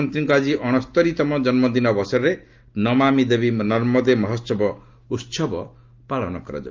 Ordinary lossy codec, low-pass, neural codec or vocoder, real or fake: Opus, 24 kbps; 7.2 kHz; none; real